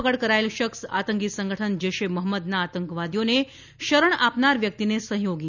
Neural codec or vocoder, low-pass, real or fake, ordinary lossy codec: none; 7.2 kHz; real; none